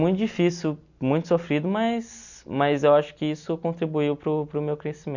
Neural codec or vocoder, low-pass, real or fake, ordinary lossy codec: none; 7.2 kHz; real; none